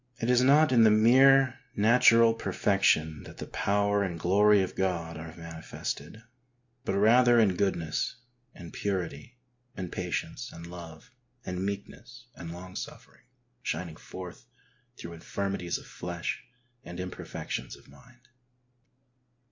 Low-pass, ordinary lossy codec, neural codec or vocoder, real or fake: 7.2 kHz; MP3, 48 kbps; none; real